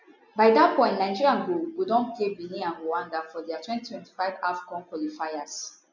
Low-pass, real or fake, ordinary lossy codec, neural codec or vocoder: 7.2 kHz; real; none; none